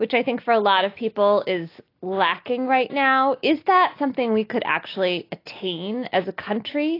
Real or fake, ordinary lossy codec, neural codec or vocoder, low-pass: real; AAC, 32 kbps; none; 5.4 kHz